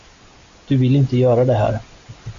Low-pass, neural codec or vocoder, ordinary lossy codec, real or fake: 7.2 kHz; none; MP3, 64 kbps; real